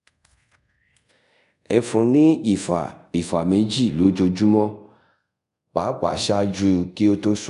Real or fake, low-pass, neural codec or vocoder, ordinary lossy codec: fake; 10.8 kHz; codec, 24 kHz, 0.5 kbps, DualCodec; none